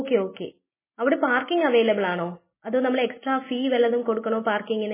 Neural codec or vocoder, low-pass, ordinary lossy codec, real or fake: none; 3.6 kHz; MP3, 16 kbps; real